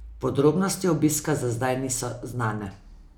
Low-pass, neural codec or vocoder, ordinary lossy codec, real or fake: none; none; none; real